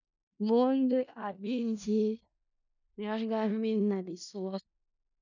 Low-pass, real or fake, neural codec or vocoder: 7.2 kHz; fake; codec, 16 kHz in and 24 kHz out, 0.4 kbps, LongCat-Audio-Codec, four codebook decoder